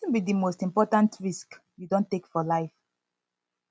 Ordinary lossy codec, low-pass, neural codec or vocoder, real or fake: none; none; none; real